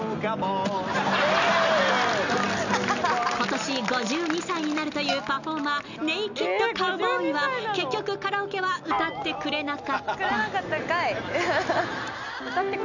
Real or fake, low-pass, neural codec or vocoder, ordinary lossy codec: real; 7.2 kHz; none; none